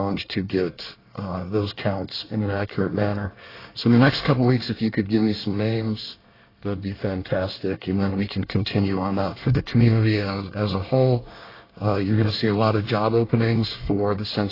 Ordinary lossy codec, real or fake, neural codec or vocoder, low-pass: AAC, 24 kbps; fake; codec, 24 kHz, 1 kbps, SNAC; 5.4 kHz